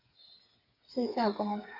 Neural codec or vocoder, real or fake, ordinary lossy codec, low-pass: codec, 16 kHz, 8 kbps, FreqCodec, smaller model; fake; AAC, 48 kbps; 5.4 kHz